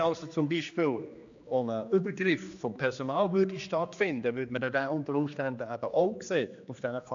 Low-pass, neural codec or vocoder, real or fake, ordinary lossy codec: 7.2 kHz; codec, 16 kHz, 2 kbps, X-Codec, HuBERT features, trained on general audio; fake; AAC, 64 kbps